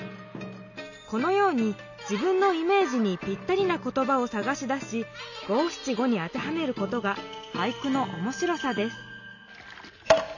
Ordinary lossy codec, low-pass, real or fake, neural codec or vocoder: none; 7.2 kHz; real; none